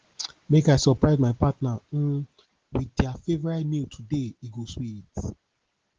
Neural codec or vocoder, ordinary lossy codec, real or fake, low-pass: none; Opus, 16 kbps; real; 7.2 kHz